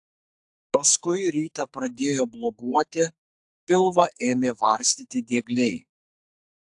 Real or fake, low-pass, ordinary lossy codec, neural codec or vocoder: fake; 10.8 kHz; AAC, 64 kbps; codec, 44.1 kHz, 2.6 kbps, SNAC